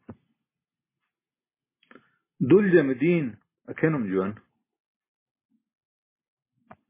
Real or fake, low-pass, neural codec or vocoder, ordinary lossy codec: real; 3.6 kHz; none; MP3, 16 kbps